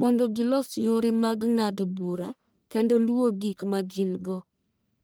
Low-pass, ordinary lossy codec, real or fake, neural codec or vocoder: none; none; fake; codec, 44.1 kHz, 1.7 kbps, Pupu-Codec